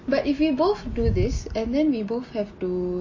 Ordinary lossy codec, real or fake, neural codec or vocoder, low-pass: MP3, 32 kbps; real; none; 7.2 kHz